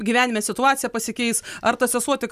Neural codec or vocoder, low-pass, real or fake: none; 14.4 kHz; real